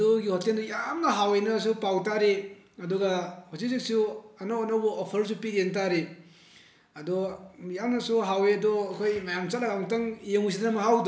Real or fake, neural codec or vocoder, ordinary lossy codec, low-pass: real; none; none; none